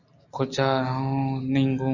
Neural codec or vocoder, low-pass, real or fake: none; 7.2 kHz; real